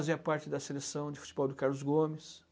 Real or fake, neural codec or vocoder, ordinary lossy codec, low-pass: real; none; none; none